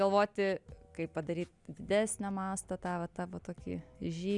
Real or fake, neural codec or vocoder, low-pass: real; none; 10.8 kHz